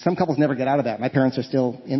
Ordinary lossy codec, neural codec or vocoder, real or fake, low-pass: MP3, 24 kbps; none; real; 7.2 kHz